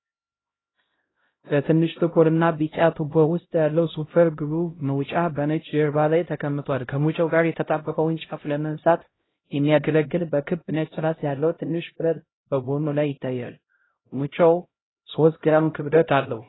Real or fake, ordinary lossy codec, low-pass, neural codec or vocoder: fake; AAC, 16 kbps; 7.2 kHz; codec, 16 kHz, 0.5 kbps, X-Codec, HuBERT features, trained on LibriSpeech